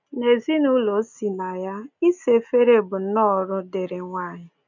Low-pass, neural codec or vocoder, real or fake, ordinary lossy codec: 7.2 kHz; none; real; none